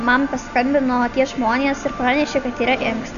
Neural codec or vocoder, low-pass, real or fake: none; 7.2 kHz; real